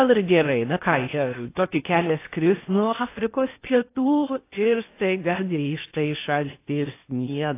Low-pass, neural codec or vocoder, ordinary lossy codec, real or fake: 3.6 kHz; codec, 16 kHz in and 24 kHz out, 0.6 kbps, FocalCodec, streaming, 4096 codes; AAC, 24 kbps; fake